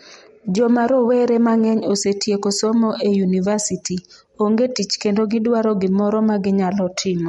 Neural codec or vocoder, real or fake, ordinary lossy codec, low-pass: none; real; MP3, 48 kbps; 19.8 kHz